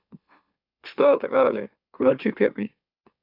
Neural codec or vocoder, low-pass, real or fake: autoencoder, 44.1 kHz, a latent of 192 numbers a frame, MeloTTS; 5.4 kHz; fake